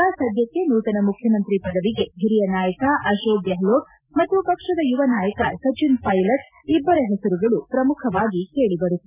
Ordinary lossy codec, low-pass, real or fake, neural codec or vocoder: none; 3.6 kHz; real; none